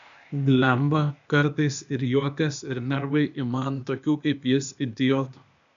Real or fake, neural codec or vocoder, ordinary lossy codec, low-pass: fake; codec, 16 kHz, 0.8 kbps, ZipCodec; MP3, 96 kbps; 7.2 kHz